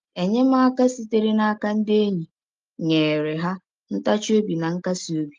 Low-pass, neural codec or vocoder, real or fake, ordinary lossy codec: 7.2 kHz; none; real; Opus, 32 kbps